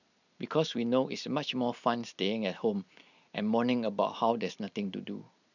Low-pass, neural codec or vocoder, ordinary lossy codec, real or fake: 7.2 kHz; none; none; real